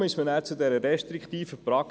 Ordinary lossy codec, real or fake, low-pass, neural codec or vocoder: none; real; none; none